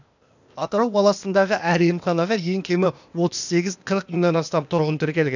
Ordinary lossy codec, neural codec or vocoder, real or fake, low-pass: none; codec, 16 kHz, 0.8 kbps, ZipCodec; fake; 7.2 kHz